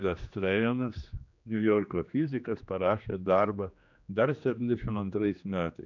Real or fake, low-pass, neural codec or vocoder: fake; 7.2 kHz; codec, 16 kHz, 2 kbps, X-Codec, HuBERT features, trained on general audio